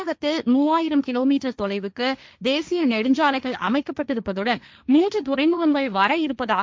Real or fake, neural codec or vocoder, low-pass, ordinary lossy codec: fake; codec, 16 kHz, 1.1 kbps, Voila-Tokenizer; none; none